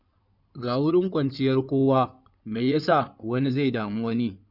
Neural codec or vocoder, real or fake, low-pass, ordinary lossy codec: codec, 16 kHz in and 24 kHz out, 2.2 kbps, FireRedTTS-2 codec; fake; 5.4 kHz; none